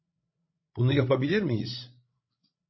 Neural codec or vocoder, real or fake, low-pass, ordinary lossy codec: codec, 16 kHz, 16 kbps, FreqCodec, larger model; fake; 7.2 kHz; MP3, 24 kbps